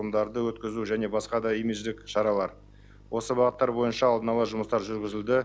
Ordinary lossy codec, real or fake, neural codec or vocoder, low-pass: none; real; none; none